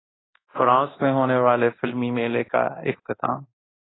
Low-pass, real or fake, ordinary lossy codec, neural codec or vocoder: 7.2 kHz; fake; AAC, 16 kbps; codec, 24 kHz, 0.9 kbps, DualCodec